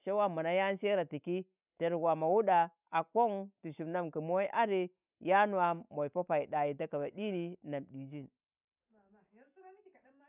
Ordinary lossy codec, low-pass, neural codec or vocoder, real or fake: none; 3.6 kHz; none; real